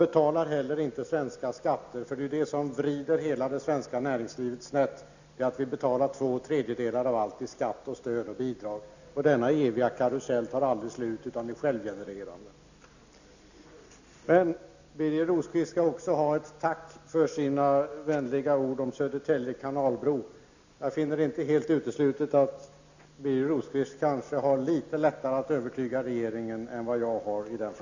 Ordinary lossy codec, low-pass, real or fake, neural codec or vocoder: none; 7.2 kHz; real; none